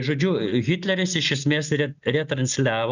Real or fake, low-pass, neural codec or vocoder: real; 7.2 kHz; none